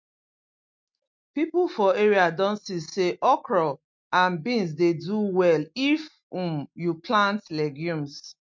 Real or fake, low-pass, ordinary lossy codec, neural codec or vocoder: real; 7.2 kHz; MP3, 48 kbps; none